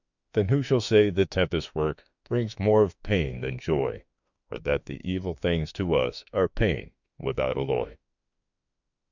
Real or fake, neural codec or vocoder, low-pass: fake; autoencoder, 48 kHz, 32 numbers a frame, DAC-VAE, trained on Japanese speech; 7.2 kHz